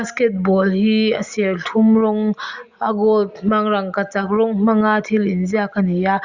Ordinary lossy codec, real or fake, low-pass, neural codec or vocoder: Opus, 64 kbps; real; 7.2 kHz; none